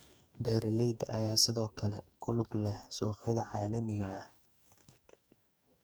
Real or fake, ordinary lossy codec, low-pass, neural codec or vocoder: fake; none; none; codec, 44.1 kHz, 2.6 kbps, DAC